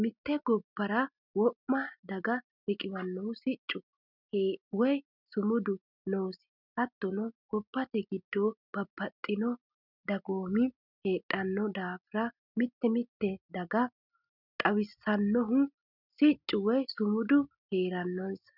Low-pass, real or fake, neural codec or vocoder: 5.4 kHz; real; none